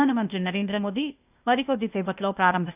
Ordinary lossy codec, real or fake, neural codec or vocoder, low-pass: none; fake; codec, 16 kHz, 0.8 kbps, ZipCodec; 3.6 kHz